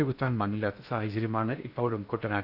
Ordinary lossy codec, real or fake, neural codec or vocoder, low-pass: none; fake; codec, 16 kHz in and 24 kHz out, 0.6 kbps, FocalCodec, streaming, 2048 codes; 5.4 kHz